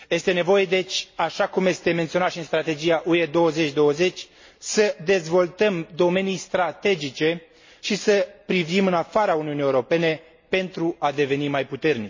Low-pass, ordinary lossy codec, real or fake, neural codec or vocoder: 7.2 kHz; MP3, 32 kbps; real; none